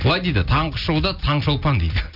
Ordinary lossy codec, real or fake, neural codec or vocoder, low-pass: AAC, 48 kbps; real; none; 5.4 kHz